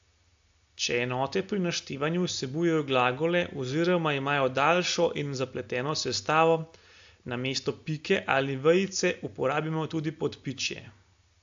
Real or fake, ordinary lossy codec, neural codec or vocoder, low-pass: real; AAC, 64 kbps; none; 7.2 kHz